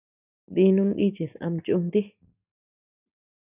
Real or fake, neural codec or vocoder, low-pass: real; none; 3.6 kHz